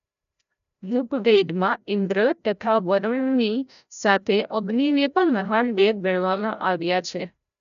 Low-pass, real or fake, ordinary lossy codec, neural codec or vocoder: 7.2 kHz; fake; none; codec, 16 kHz, 0.5 kbps, FreqCodec, larger model